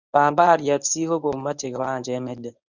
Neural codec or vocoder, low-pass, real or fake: codec, 24 kHz, 0.9 kbps, WavTokenizer, medium speech release version 1; 7.2 kHz; fake